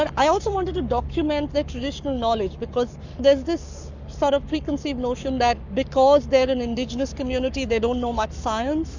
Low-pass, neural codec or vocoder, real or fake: 7.2 kHz; codec, 44.1 kHz, 7.8 kbps, Pupu-Codec; fake